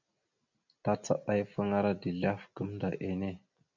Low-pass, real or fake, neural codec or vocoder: 7.2 kHz; real; none